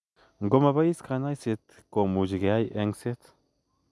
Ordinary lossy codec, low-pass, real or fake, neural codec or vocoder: none; none; real; none